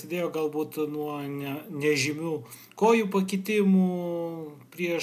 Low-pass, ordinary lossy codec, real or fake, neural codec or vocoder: 14.4 kHz; MP3, 96 kbps; real; none